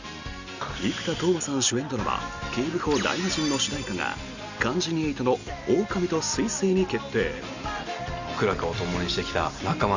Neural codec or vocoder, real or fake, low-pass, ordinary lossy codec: none; real; 7.2 kHz; Opus, 64 kbps